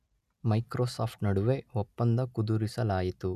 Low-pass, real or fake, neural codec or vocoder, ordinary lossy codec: 14.4 kHz; real; none; none